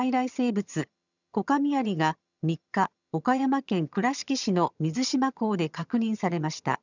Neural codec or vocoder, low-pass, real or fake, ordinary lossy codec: vocoder, 22.05 kHz, 80 mel bands, HiFi-GAN; 7.2 kHz; fake; none